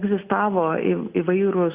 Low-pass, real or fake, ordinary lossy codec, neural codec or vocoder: 3.6 kHz; real; Opus, 64 kbps; none